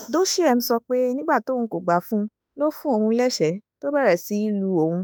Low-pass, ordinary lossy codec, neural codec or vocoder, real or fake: none; none; autoencoder, 48 kHz, 32 numbers a frame, DAC-VAE, trained on Japanese speech; fake